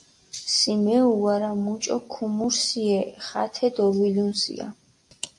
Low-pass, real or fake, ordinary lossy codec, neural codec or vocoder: 10.8 kHz; real; AAC, 64 kbps; none